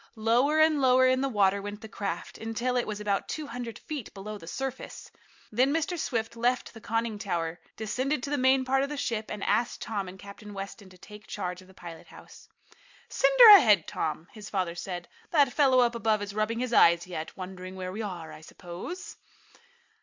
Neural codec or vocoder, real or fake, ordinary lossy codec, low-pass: none; real; MP3, 64 kbps; 7.2 kHz